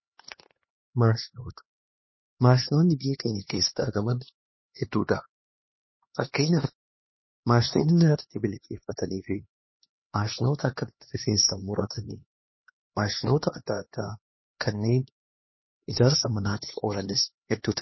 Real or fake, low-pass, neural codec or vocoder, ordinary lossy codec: fake; 7.2 kHz; codec, 16 kHz, 2 kbps, X-Codec, HuBERT features, trained on LibriSpeech; MP3, 24 kbps